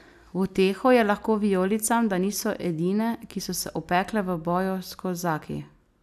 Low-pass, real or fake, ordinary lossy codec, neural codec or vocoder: 14.4 kHz; real; none; none